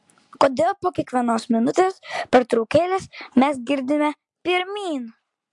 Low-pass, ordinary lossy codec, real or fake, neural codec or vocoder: 10.8 kHz; MP3, 64 kbps; real; none